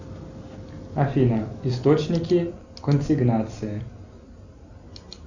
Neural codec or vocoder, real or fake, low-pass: none; real; 7.2 kHz